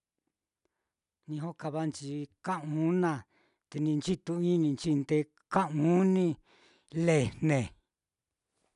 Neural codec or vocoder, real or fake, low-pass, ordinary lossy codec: none; real; 10.8 kHz; none